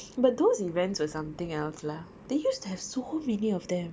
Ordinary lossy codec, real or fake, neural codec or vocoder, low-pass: none; real; none; none